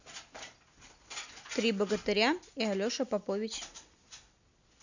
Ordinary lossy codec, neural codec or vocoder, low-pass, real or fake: none; none; 7.2 kHz; real